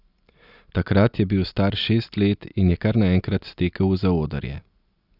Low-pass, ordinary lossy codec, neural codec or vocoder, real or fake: 5.4 kHz; none; none; real